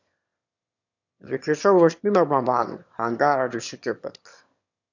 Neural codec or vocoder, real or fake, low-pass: autoencoder, 22.05 kHz, a latent of 192 numbers a frame, VITS, trained on one speaker; fake; 7.2 kHz